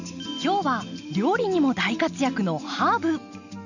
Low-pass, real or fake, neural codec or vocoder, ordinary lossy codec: 7.2 kHz; real; none; none